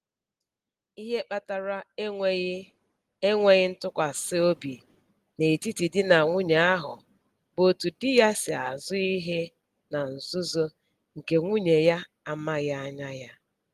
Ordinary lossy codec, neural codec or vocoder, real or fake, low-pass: Opus, 24 kbps; none; real; 14.4 kHz